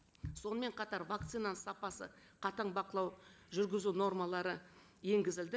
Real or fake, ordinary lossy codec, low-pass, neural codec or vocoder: real; none; none; none